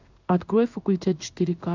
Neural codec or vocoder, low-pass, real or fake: codec, 16 kHz in and 24 kHz out, 1 kbps, XY-Tokenizer; 7.2 kHz; fake